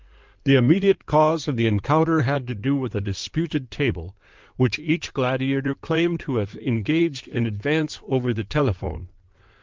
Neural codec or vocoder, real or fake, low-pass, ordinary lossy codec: codec, 16 kHz in and 24 kHz out, 2.2 kbps, FireRedTTS-2 codec; fake; 7.2 kHz; Opus, 32 kbps